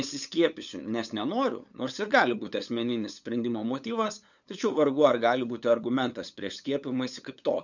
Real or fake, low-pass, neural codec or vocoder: fake; 7.2 kHz; codec, 16 kHz, 8 kbps, FunCodec, trained on LibriTTS, 25 frames a second